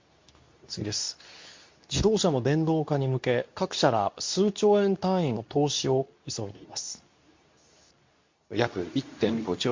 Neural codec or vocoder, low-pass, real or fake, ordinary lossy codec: codec, 24 kHz, 0.9 kbps, WavTokenizer, medium speech release version 2; 7.2 kHz; fake; MP3, 48 kbps